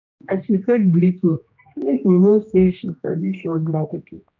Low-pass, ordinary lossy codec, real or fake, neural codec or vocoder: 7.2 kHz; none; fake; codec, 16 kHz, 1 kbps, X-Codec, HuBERT features, trained on general audio